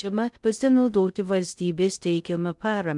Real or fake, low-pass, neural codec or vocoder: fake; 10.8 kHz; codec, 16 kHz in and 24 kHz out, 0.6 kbps, FocalCodec, streaming, 2048 codes